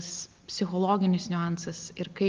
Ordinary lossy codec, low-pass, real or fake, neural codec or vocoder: Opus, 32 kbps; 7.2 kHz; real; none